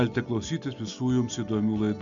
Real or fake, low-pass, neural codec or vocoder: real; 7.2 kHz; none